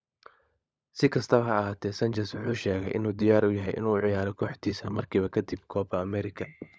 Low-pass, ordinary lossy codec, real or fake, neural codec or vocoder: none; none; fake; codec, 16 kHz, 16 kbps, FunCodec, trained on LibriTTS, 50 frames a second